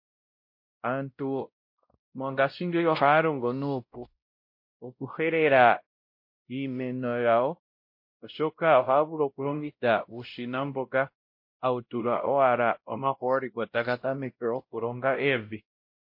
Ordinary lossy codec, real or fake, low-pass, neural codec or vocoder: MP3, 32 kbps; fake; 5.4 kHz; codec, 16 kHz, 0.5 kbps, X-Codec, WavLM features, trained on Multilingual LibriSpeech